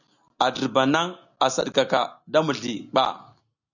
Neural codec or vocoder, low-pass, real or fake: none; 7.2 kHz; real